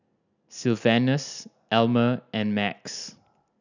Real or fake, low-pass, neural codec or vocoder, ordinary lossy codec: real; 7.2 kHz; none; none